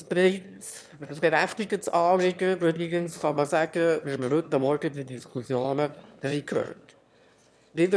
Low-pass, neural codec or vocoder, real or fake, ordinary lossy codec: none; autoencoder, 22.05 kHz, a latent of 192 numbers a frame, VITS, trained on one speaker; fake; none